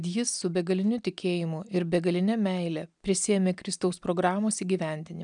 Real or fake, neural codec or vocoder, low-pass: real; none; 9.9 kHz